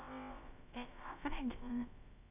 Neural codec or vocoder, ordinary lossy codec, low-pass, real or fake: codec, 16 kHz, about 1 kbps, DyCAST, with the encoder's durations; none; 3.6 kHz; fake